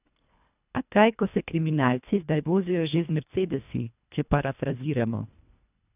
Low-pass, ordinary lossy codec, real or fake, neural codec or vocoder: 3.6 kHz; none; fake; codec, 24 kHz, 1.5 kbps, HILCodec